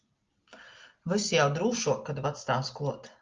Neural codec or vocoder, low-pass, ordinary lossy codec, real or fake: none; 7.2 kHz; Opus, 32 kbps; real